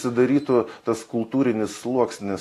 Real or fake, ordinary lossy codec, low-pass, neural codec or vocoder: real; AAC, 48 kbps; 14.4 kHz; none